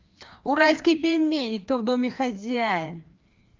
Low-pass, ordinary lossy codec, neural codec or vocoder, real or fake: 7.2 kHz; Opus, 32 kbps; codec, 16 kHz, 2 kbps, FreqCodec, larger model; fake